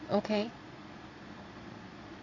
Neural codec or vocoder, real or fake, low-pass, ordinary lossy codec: vocoder, 22.05 kHz, 80 mel bands, Vocos; fake; 7.2 kHz; AAC, 48 kbps